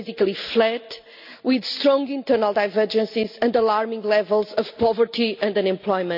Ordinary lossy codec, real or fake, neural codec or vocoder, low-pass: none; real; none; 5.4 kHz